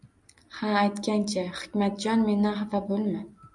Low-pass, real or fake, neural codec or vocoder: 10.8 kHz; real; none